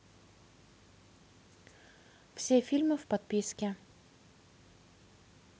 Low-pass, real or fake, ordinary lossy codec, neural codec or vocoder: none; real; none; none